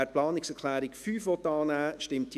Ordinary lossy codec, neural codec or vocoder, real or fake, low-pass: none; autoencoder, 48 kHz, 128 numbers a frame, DAC-VAE, trained on Japanese speech; fake; 14.4 kHz